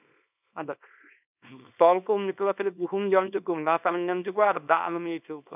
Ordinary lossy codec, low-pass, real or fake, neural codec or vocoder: none; 3.6 kHz; fake; codec, 24 kHz, 0.9 kbps, WavTokenizer, small release